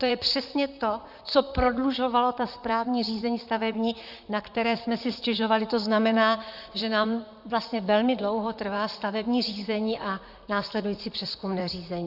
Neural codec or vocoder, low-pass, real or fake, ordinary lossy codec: vocoder, 44.1 kHz, 128 mel bands, Pupu-Vocoder; 5.4 kHz; fake; Opus, 64 kbps